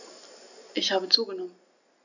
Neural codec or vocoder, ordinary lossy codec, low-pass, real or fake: none; none; 7.2 kHz; real